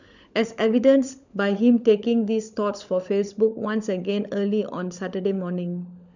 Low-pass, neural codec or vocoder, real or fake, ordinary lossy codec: 7.2 kHz; codec, 16 kHz, 16 kbps, FunCodec, trained on LibriTTS, 50 frames a second; fake; none